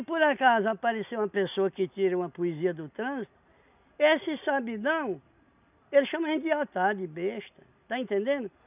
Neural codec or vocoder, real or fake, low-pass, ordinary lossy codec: none; real; 3.6 kHz; none